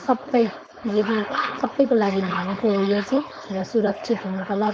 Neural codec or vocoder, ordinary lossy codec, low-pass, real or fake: codec, 16 kHz, 4.8 kbps, FACodec; none; none; fake